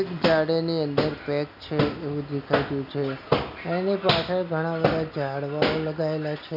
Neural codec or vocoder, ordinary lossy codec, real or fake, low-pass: none; none; real; 5.4 kHz